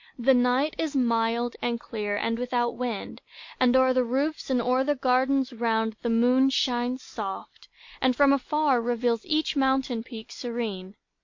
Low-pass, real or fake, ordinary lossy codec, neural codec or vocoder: 7.2 kHz; real; MP3, 48 kbps; none